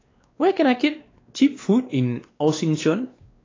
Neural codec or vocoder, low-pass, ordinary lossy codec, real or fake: codec, 16 kHz, 2 kbps, X-Codec, WavLM features, trained on Multilingual LibriSpeech; 7.2 kHz; AAC, 32 kbps; fake